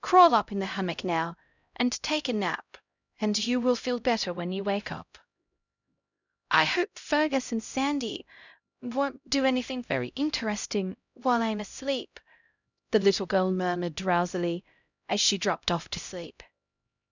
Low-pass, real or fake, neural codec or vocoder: 7.2 kHz; fake; codec, 16 kHz, 0.5 kbps, X-Codec, HuBERT features, trained on LibriSpeech